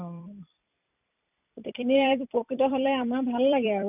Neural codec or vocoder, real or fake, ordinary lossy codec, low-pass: vocoder, 44.1 kHz, 128 mel bands every 256 samples, BigVGAN v2; fake; none; 3.6 kHz